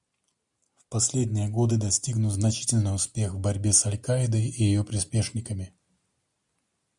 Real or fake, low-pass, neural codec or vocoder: real; 10.8 kHz; none